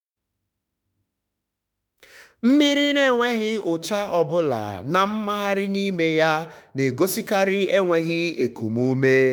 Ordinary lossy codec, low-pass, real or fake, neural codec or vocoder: none; none; fake; autoencoder, 48 kHz, 32 numbers a frame, DAC-VAE, trained on Japanese speech